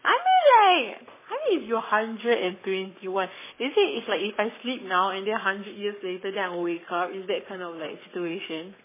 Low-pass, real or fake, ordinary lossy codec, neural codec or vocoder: 3.6 kHz; fake; MP3, 16 kbps; codec, 44.1 kHz, 7.8 kbps, Pupu-Codec